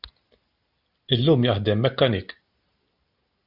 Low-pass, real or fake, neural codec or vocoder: 5.4 kHz; real; none